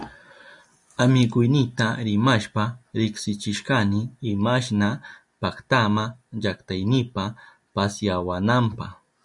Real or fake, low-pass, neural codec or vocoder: real; 10.8 kHz; none